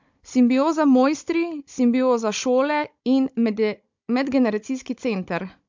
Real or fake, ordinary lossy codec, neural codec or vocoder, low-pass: fake; MP3, 64 kbps; codec, 16 kHz, 16 kbps, FunCodec, trained on Chinese and English, 50 frames a second; 7.2 kHz